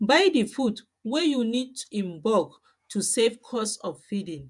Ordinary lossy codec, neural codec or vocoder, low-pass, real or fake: MP3, 96 kbps; vocoder, 48 kHz, 128 mel bands, Vocos; 10.8 kHz; fake